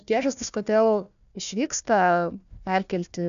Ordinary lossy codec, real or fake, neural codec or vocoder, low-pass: AAC, 96 kbps; fake; codec, 16 kHz, 1 kbps, FunCodec, trained on Chinese and English, 50 frames a second; 7.2 kHz